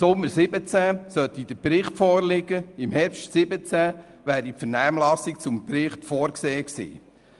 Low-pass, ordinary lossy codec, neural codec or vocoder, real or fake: 10.8 kHz; Opus, 32 kbps; none; real